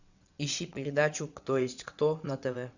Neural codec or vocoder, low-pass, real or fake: vocoder, 22.05 kHz, 80 mel bands, WaveNeXt; 7.2 kHz; fake